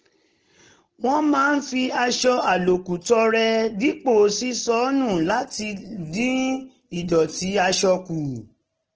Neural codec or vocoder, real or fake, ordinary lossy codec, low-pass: none; real; Opus, 16 kbps; 7.2 kHz